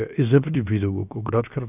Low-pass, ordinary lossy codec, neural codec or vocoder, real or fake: 3.6 kHz; none; codec, 16 kHz, 0.7 kbps, FocalCodec; fake